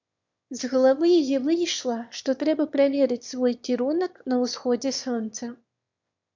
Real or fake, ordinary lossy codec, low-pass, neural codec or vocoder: fake; MP3, 64 kbps; 7.2 kHz; autoencoder, 22.05 kHz, a latent of 192 numbers a frame, VITS, trained on one speaker